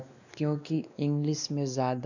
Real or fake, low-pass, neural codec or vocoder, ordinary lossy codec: fake; 7.2 kHz; codec, 16 kHz, 2 kbps, X-Codec, WavLM features, trained on Multilingual LibriSpeech; none